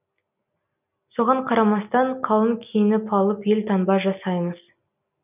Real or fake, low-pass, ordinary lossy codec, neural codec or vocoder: real; 3.6 kHz; none; none